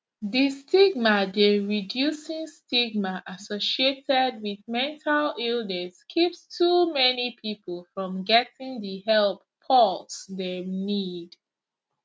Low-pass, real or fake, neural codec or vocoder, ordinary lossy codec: none; real; none; none